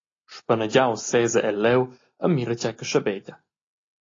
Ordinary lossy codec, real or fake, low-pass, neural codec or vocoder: AAC, 32 kbps; real; 7.2 kHz; none